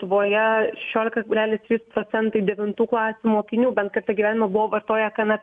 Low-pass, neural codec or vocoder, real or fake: 10.8 kHz; none; real